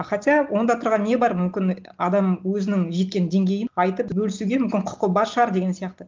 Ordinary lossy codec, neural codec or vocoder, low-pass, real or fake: Opus, 24 kbps; none; 7.2 kHz; real